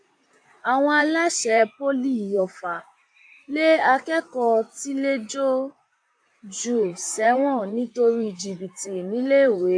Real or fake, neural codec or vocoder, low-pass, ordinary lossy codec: fake; vocoder, 44.1 kHz, 128 mel bands, Pupu-Vocoder; 9.9 kHz; AAC, 64 kbps